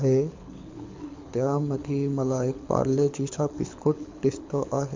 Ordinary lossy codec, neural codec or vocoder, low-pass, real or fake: MP3, 64 kbps; codec, 24 kHz, 6 kbps, HILCodec; 7.2 kHz; fake